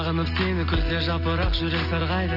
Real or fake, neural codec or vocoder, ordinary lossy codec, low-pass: real; none; none; 5.4 kHz